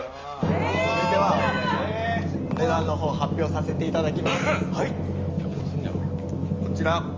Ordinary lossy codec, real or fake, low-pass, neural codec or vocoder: Opus, 32 kbps; real; 7.2 kHz; none